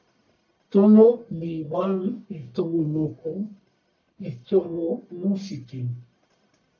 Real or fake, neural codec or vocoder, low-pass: fake; codec, 44.1 kHz, 1.7 kbps, Pupu-Codec; 7.2 kHz